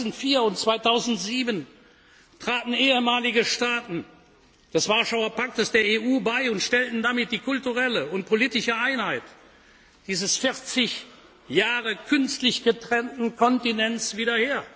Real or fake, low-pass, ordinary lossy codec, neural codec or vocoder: real; none; none; none